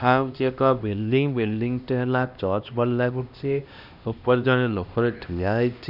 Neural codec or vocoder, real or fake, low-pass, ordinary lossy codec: codec, 16 kHz, 1 kbps, X-Codec, HuBERT features, trained on LibriSpeech; fake; 5.4 kHz; none